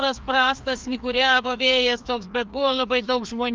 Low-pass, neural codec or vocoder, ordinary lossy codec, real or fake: 7.2 kHz; codec, 16 kHz, 2 kbps, FreqCodec, larger model; Opus, 24 kbps; fake